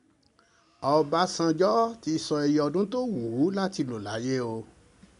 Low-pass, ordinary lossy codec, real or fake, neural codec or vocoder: 10.8 kHz; none; real; none